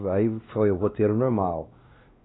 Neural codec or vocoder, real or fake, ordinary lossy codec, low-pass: codec, 16 kHz, 2 kbps, X-Codec, WavLM features, trained on Multilingual LibriSpeech; fake; AAC, 16 kbps; 7.2 kHz